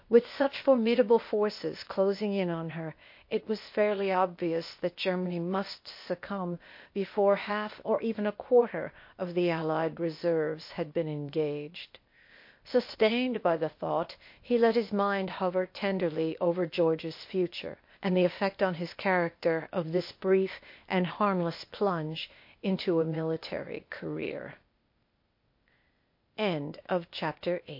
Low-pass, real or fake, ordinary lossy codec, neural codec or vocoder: 5.4 kHz; fake; MP3, 32 kbps; codec, 16 kHz, 0.8 kbps, ZipCodec